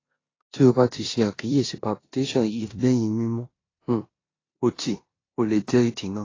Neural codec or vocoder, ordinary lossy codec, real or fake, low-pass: codec, 16 kHz in and 24 kHz out, 0.9 kbps, LongCat-Audio-Codec, four codebook decoder; AAC, 32 kbps; fake; 7.2 kHz